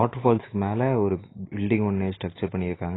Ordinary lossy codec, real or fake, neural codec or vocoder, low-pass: AAC, 16 kbps; real; none; 7.2 kHz